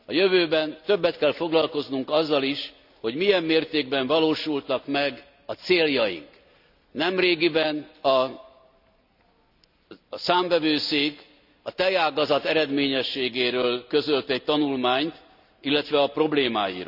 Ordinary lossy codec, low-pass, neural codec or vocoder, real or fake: none; 5.4 kHz; none; real